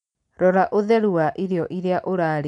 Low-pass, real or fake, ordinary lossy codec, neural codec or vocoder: 10.8 kHz; real; none; none